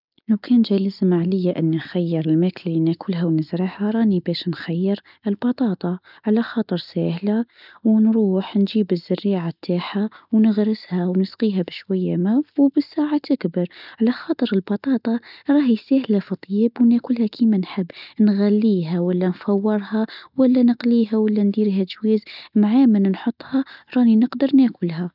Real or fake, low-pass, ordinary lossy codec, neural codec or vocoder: real; 5.4 kHz; none; none